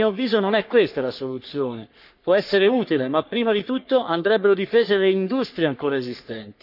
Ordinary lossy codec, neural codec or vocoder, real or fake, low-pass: none; codec, 44.1 kHz, 3.4 kbps, Pupu-Codec; fake; 5.4 kHz